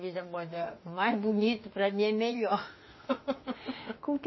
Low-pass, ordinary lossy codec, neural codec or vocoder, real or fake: 7.2 kHz; MP3, 24 kbps; autoencoder, 48 kHz, 32 numbers a frame, DAC-VAE, trained on Japanese speech; fake